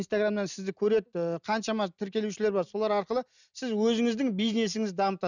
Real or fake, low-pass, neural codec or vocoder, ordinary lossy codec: real; 7.2 kHz; none; none